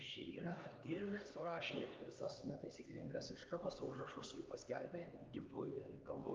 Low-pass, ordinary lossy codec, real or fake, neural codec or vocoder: 7.2 kHz; Opus, 24 kbps; fake; codec, 16 kHz, 2 kbps, X-Codec, HuBERT features, trained on LibriSpeech